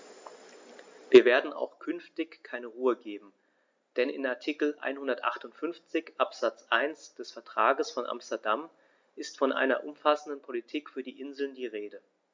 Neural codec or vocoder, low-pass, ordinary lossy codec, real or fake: none; 7.2 kHz; MP3, 64 kbps; real